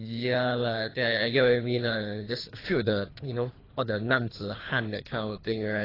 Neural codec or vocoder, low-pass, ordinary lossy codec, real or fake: codec, 24 kHz, 3 kbps, HILCodec; 5.4 kHz; AAC, 24 kbps; fake